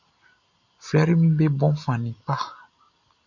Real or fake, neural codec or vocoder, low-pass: real; none; 7.2 kHz